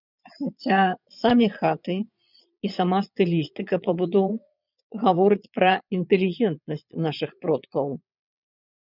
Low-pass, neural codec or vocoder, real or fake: 5.4 kHz; vocoder, 22.05 kHz, 80 mel bands, Vocos; fake